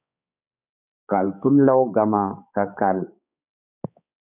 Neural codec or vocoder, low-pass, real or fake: codec, 16 kHz, 4 kbps, X-Codec, HuBERT features, trained on balanced general audio; 3.6 kHz; fake